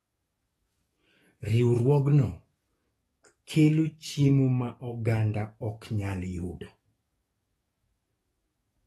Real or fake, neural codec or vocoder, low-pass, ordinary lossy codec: fake; codec, 44.1 kHz, 7.8 kbps, DAC; 19.8 kHz; AAC, 32 kbps